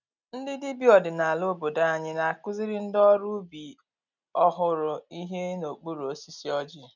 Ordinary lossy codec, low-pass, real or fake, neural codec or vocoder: none; 7.2 kHz; real; none